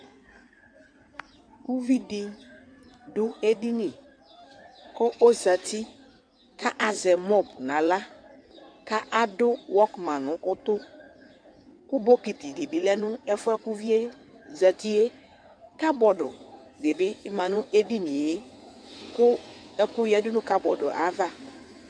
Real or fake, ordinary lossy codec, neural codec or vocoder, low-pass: fake; MP3, 64 kbps; codec, 16 kHz in and 24 kHz out, 2.2 kbps, FireRedTTS-2 codec; 9.9 kHz